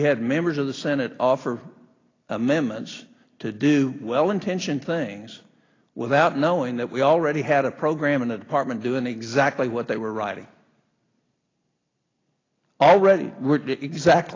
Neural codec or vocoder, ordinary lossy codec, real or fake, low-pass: none; AAC, 32 kbps; real; 7.2 kHz